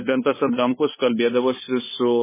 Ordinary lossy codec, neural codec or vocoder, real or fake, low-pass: MP3, 16 kbps; codec, 16 kHz, 2 kbps, FunCodec, trained on LibriTTS, 25 frames a second; fake; 3.6 kHz